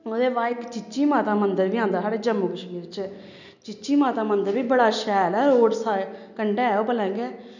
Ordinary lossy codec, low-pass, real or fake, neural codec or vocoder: none; 7.2 kHz; real; none